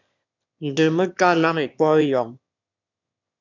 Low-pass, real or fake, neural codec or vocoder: 7.2 kHz; fake; autoencoder, 22.05 kHz, a latent of 192 numbers a frame, VITS, trained on one speaker